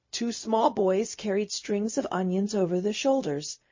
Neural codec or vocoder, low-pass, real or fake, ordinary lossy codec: codec, 16 kHz, 0.4 kbps, LongCat-Audio-Codec; 7.2 kHz; fake; MP3, 32 kbps